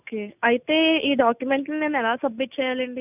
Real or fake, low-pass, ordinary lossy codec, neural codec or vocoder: real; 3.6 kHz; none; none